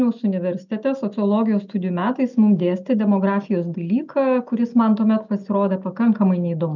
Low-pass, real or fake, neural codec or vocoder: 7.2 kHz; real; none